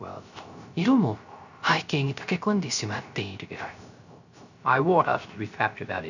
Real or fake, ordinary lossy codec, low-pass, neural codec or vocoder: fake; none; 7.2 kHz; codec, 16 kHz, 0.3 kbps, FocalCodec